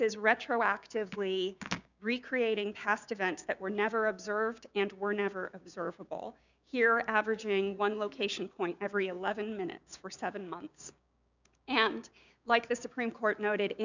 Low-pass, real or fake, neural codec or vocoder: 7.2 kHz; fake; codec, 16 kHz, 6 kbps, DAC